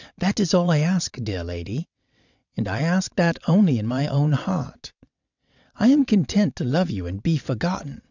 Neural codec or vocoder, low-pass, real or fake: vocoder, 22.05 kHz, 80 mel bands, WaveNeXt; 7.2 kHz; fake